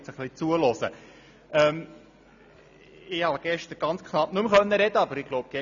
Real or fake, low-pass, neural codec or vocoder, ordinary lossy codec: real; 7.2 kHz; none; none